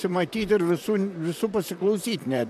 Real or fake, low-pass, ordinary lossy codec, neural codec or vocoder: fake; 14.4 kHz; AAC, 96 kbps; vocoder, 44.1 kHz, 128 mel bands, Pupu-Vocoder